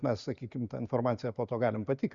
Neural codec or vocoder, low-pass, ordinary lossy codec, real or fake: none; 7.2 kHz; Opus, 64 kbps; real